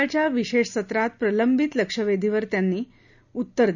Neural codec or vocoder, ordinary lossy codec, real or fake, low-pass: none; none; real; 7.2 kHz